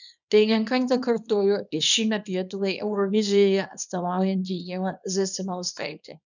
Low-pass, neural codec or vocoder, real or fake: 7.2 kHz; codec, 24 kHz, 0.9 kbps, WavTokenizer, small release; fake